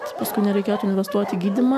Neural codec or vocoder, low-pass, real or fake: none; 14.4 kHz; real